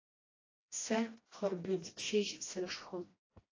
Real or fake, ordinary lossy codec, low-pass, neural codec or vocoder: fake; AAC, 32 kbps; 7.2 kHz; codec, 16 kHz, 1 kbps, FreqCodec, smaller model